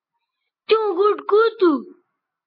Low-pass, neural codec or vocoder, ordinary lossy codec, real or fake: 5.4 kHz; none; MP3, 24 kbps; real